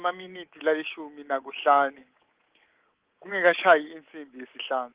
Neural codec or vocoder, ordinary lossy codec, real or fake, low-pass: codec, 24 kHz, 3.1 kbps, DualCodec; Opus, 16 kbps; fake; 3.6 kHz